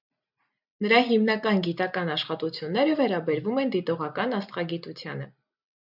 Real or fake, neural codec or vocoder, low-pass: real; none; 5.4 kHz